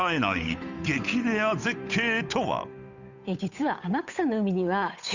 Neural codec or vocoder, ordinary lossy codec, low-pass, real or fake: codec, 16 kHz, 8 kbps, FunCodec, trained on Chinese and English, 25 frames a second; none; 7.2 kHz; fake